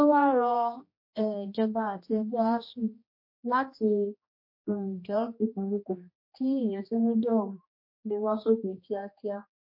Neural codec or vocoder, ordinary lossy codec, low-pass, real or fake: codec, 16 kHz, 1 kbps, X-Codec, HuBERT features, trained on general audio; MP3, 32 kbps; 5.4 kHz; fake